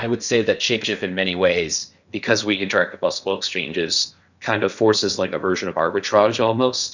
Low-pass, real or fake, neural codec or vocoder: 7.2 kHz; fake; codec, 16 kHz in and 24 kHz out, 0.8 kbps, FocalCodec, streaming, 65536 codes